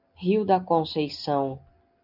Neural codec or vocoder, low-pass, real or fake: none; 5.4 kHz; real